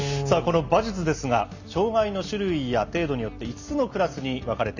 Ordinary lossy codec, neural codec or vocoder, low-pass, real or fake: none; none; 7.2 kHz; real